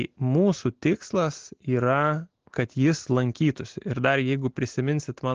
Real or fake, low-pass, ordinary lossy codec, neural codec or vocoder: real; 7.2 kHz; Opus, 16 kbps; none